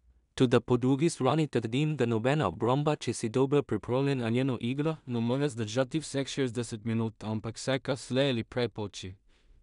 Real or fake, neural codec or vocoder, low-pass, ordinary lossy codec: fake; codec, 16 kHz in and 24 kHz out, 0.4 kbps, LongCat-Audio-Codec, two codebook decoder; 10.8 kHz; none